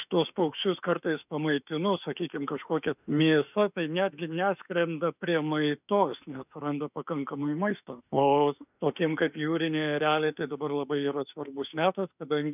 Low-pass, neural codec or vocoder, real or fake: 3.6 kHz; autoencoder, 48 kHz, 32 numbers a frame, DAC-VAE, trained on Japanese speech; fake